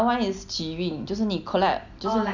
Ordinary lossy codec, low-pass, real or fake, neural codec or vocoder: none; 7.2 kHz; real; none